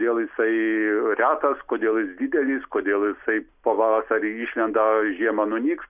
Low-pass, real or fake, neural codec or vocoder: 3.6 kHz; real; none